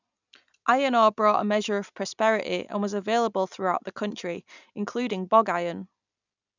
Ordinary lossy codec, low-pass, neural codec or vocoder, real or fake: none; 7.2 kHz; none; real